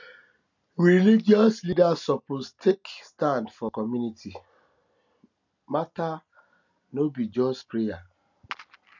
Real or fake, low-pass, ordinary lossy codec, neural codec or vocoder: real; 7.2 kHz; none; none